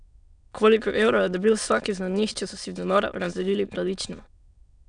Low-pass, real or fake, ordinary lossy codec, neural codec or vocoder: 9.9 kHz; fake; none; autoencoder, 22.05 kHz, a latent of 192 numbers a frame, VITS, trained on many speakers